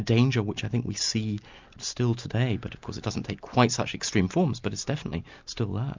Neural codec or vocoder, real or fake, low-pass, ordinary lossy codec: none; real; 7.2 kHz; MP3, 64 kbps